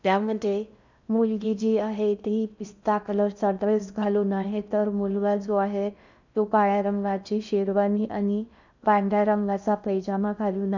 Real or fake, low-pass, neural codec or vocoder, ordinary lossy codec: fake; 7.2 kHz; codec, 16 kHz in and 24 kHz out, 0.6 kbps, FocalCodec, streaming, 2048 codes; none